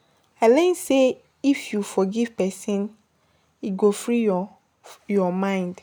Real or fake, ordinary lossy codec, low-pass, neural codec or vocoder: real; none; none; none